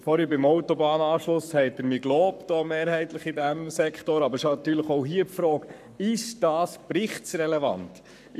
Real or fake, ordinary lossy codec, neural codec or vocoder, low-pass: fake; none; codec, 44.1 kHz, 7.8 kbps, Pupu-Codec; 14.4 kHz